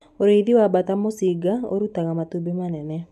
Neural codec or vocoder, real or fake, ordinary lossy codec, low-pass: none; real; none; 14.4 kHz